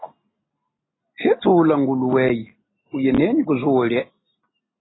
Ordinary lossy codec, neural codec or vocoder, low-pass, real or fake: AAC, 16 kbps; none; 7.2 kHz; real